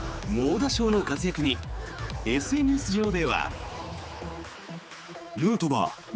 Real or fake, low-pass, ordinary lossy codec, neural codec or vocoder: fake; none; none; codec, 16 kHz, 4 kbps, X-Codec, HuBERT features, trained on general audio